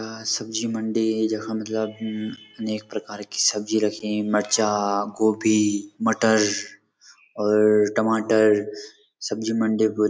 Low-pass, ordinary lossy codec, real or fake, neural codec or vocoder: none; none; real; none